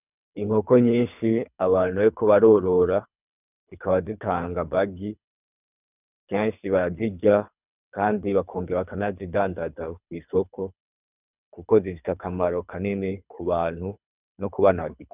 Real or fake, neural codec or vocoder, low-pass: fake; codec, 24 kHz, 3 kbps, HILCodec; 3.6 kHz